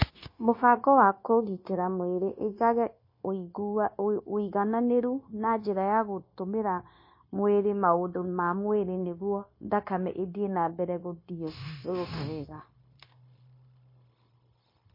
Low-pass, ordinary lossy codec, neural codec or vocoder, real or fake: 5.4 kHz; MP3, 24 kbps; codec, 16 kHz, 0.9 kbps, LongCat-Audio-Codec; fake